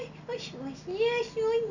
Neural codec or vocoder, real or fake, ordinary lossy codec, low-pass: none; real; none; 7.2 kHz